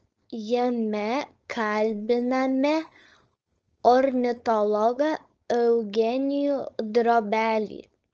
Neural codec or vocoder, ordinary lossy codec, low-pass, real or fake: codec, 16 kHz, 4.8 kbps, FACodec; Opus, 24 kbps; 7.2 kHz; fake